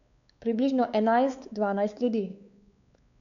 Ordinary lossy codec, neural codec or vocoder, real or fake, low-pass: none; codec, 16 kHz, 4 kbps, X-Codec, WavLM features, trained on Multilingual LibriSpeech; fake; 7.2 kHz